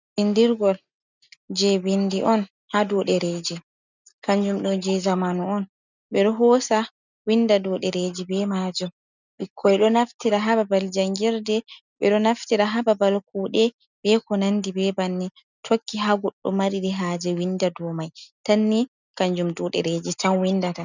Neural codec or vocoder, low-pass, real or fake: none; 7.2 kHz; real